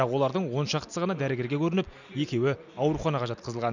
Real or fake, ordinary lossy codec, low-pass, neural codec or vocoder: real; none; 7.2 kHz; none